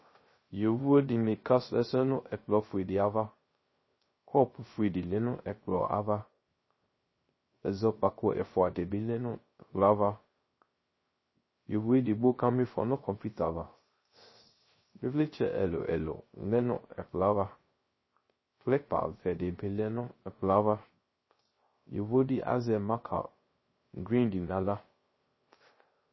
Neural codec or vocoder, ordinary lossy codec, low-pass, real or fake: codec, 16 kHz, 0.3 kbps, FocalCodec; MP3, 24 kbps; 7.2 kHz; fake